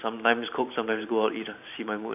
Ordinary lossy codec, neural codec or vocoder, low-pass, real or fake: none; vocoder, 44.1 kHz, 128 mel bands every 512 samples, BigVGAN v2; 3.6 kHz; fake